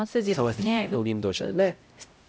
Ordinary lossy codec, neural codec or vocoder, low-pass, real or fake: none; codec, 16 kHz, 0.5 kbps, X-Codec, HuBERT features, trained on LibriSpeech; none; fake